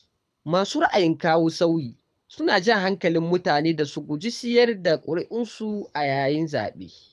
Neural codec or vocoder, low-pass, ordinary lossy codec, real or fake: codec, 24 kHz, 6 kbps, HILCodec; none; none; fake